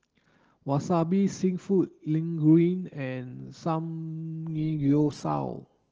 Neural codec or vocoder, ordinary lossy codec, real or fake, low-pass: none; Opus, 16 kbps; real; 7.2 kHz